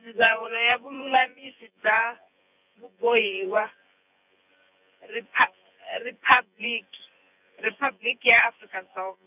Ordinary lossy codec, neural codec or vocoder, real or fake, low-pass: none; vocoder, 24 kHz, 100 mel bands, Vocos; fake; 3.6 kHz